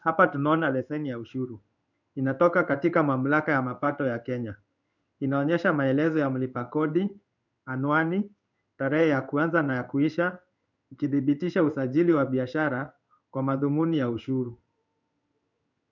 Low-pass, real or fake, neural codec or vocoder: 7.2 kHz; fake; codec, 16 kHz in and 24 kHz out, 1 kbps, XY-Tokenizer